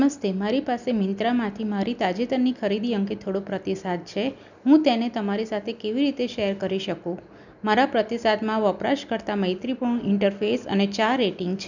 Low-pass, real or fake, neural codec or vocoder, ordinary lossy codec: 7.2 kHz; real; none; none